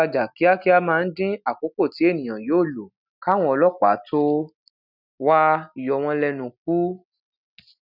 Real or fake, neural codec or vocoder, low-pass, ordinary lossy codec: real; none; 5.4 kHz; none